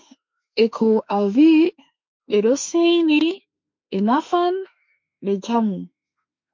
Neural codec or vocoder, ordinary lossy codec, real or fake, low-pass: autoencoder, 48 kHz, 32 numbers a frame, DAC-VAE, trained on Japanese speech; MP3, 48 kbps; fake; 7.2 kHz